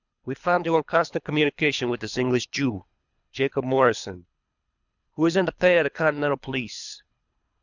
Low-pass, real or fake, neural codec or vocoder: 7.2 kHz; fake; codec, 24 kHz, 3 kbps, HILCodec